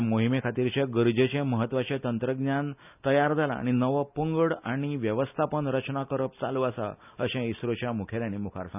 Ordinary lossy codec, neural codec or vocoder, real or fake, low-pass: none; none; real; 3.6 kHz